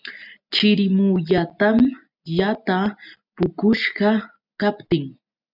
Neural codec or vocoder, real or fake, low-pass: none; real; 5.4 kHz